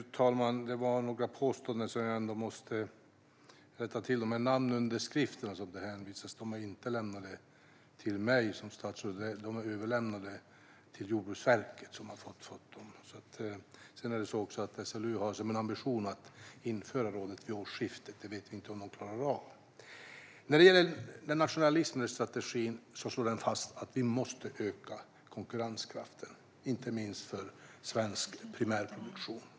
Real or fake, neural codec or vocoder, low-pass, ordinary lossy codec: real; none; none; none